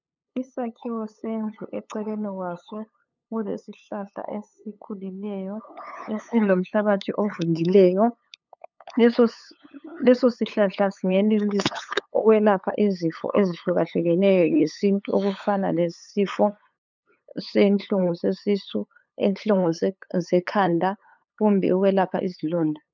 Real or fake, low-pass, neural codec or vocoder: fake; 7.2 kHz; codec, 16 kHz, 8 kbps, FunCodec, trained on LibriTTS, 25 frames a second